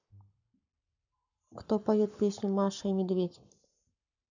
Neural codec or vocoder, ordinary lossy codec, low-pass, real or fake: codec, 44.1 kHz, 7.8 kbps, Pupu-Codec; none; 7.2 kHz; fake